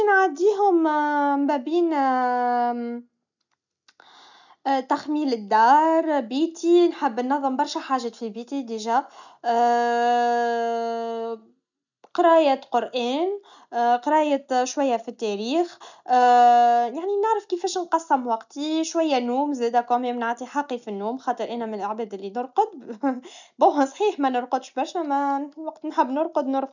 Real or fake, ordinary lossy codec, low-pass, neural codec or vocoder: real; none; 7.2 kHz; none